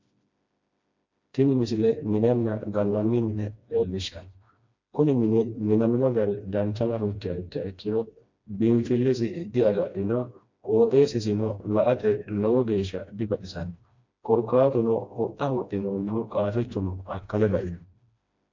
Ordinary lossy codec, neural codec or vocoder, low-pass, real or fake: MP3, 48 kbps; codec, 16 kHz, 1 kbps, FreqCodec, smaller model; 7.2 kHz; fake